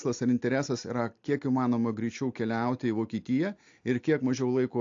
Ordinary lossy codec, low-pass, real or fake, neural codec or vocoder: AAC, 48 kbps; 7.2 kHz; real; none